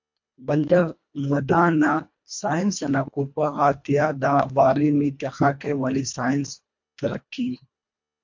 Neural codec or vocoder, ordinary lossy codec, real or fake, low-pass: codec, 24 kHz, 1.5 kbps, HILCodec; MP3, 48 kbps; fake; 7.2 kHz